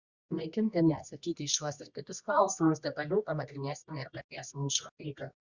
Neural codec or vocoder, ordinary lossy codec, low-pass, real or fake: codec, 24 kHz, 0.9 kbps, WavTokenizer, medium music audio release; Opus, 64 kbps; 7.2 kHz; fake